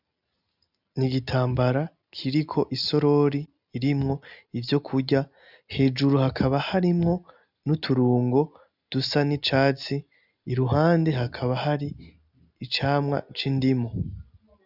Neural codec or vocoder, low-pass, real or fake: none; 5.4 kHz; real